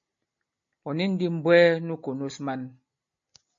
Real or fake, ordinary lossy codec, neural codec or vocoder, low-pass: real; MP3, 48 kbps; none; 7.2 kHz